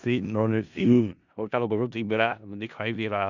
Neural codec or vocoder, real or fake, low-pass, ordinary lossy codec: codec, 16 kHz in and 24 kHz out, 0.4 kbps, LongCat-Audio-Codec, four codebook decoder; fake; 7.2 kHz; none